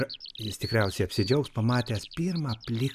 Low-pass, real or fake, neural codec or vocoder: 14.4 kHz; real; none